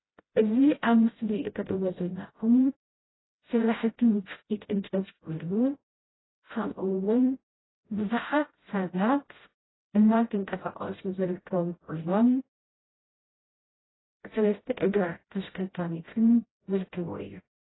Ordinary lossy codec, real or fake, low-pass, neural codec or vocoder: AAC, 16 kbps; fake; 7.2 kHz; codec, 16 kHz, 0.5 kbps, FreqCodec, smaller model